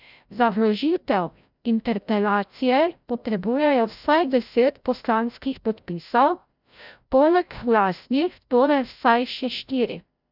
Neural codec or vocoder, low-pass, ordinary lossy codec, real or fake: codec, 16 kHz, 0.5 kbps, FreqCodec, larger model; 5.4 kHz; none; fake